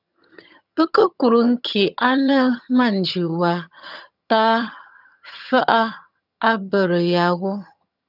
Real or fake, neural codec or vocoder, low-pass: fake; vocoder, 22.05 kHz, 80 mel bands, HiFi-GAN; 5.4 kHz